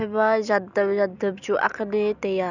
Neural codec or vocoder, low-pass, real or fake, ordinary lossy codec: none; 7.2 kHz; real; none